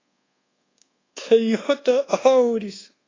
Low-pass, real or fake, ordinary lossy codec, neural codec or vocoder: 7.2 kHz; fake; AAC, 32 kbps; codec, 24 kHz, 1.2 kbps, DualCodec